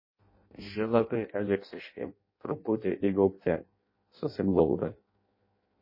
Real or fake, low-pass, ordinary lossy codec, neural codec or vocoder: fake; 5.4 kHz; MP3, 24 kbps; codec, 16 kHz in and 24 kHz out, 0.6 kbps, FireRedTTS-2 codec